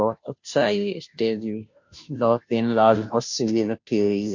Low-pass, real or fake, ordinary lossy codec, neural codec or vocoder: 7.2 kHz; fake; MP3, 48 kbps; codec, 16 kHz, 0.5 kbps, FunCodec, trained on Chinese and English, 25 frames a second